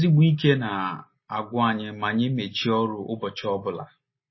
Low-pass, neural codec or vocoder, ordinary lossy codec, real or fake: 7.2 kHz; none; MP3, 24 kbps; real